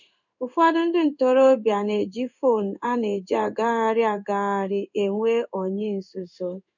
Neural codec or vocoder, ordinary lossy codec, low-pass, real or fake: codec, 16 kHz in and 24 kHz out, 1 kbps, XY-Tokenizer; none; 7.2 kHz; fake